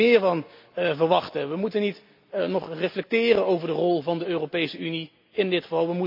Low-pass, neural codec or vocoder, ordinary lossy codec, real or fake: 5.4 kHz; none; MP3, 48 kbps; real